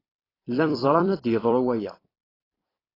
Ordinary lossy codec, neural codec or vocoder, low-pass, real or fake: AAC, 24 kbps; vocoder, 44.1 kHz, 128 mel bands every 256 samples, BigVGAN v2; 5.4 kHz; fake